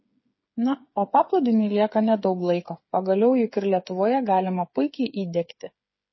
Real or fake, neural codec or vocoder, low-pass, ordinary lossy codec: fake; codec, 16 kHz, 8 kbps, FreqCodec, smaller model; 7.2 kHz; MP3, 24 kbps